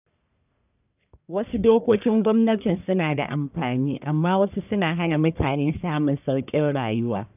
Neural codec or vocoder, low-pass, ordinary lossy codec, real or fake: codec, 44.1 kHz, 1.7 kbps, Pupu-Codec; 3.6 kHz; none; fake